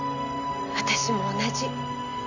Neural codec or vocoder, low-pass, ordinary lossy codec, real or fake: none; 7.2 kHz; none; real